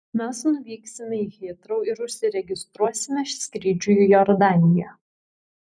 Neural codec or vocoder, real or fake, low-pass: vocoder, 44.1 kHz, 128 mel bands every 256 samples, BigVGAN v2; fake; 9.9 kHz